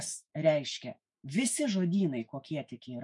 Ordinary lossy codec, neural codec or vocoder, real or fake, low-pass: MP3, 64 kbps; autoencoder, 48 kHz, 128 numbers a frame, DAC-VAE, trained on Japanese speech; fake; 10.8 kHz